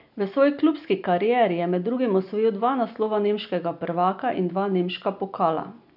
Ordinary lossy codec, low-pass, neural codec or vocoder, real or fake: none; 5.4 kHz; none; real